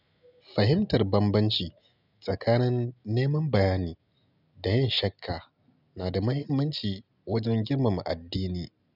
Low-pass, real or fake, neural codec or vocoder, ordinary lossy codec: 5.4 kHz; real; none; none